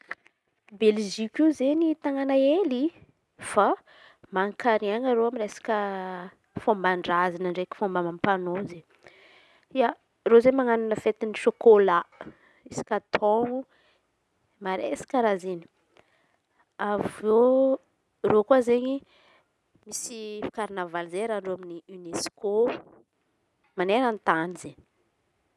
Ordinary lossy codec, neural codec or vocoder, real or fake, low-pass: none; none; real; none